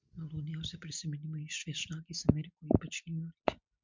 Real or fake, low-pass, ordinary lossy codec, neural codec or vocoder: real; 7.2 kHz; MP3, 64 kbps; none